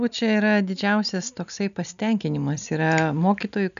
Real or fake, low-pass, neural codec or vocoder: real; 7.2 kHz; none